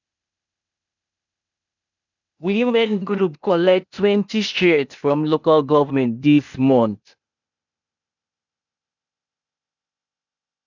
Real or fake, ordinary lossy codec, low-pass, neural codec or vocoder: fake; none; 7.2 kHz; codec, 16 kHz, 0.8 kbps, ZipCodec